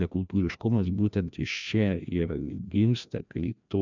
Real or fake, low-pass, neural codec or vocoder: fake; 7.2 kHz; codec, 16 kHz, 1 kbps, FreqCodec, larger model